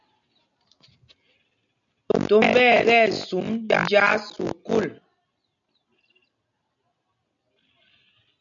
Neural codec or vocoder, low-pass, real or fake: none; 7.2 kHz; real